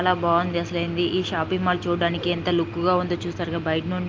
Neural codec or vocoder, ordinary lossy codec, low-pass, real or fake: none; Opus, 24 kbps; 7.2 kHz; real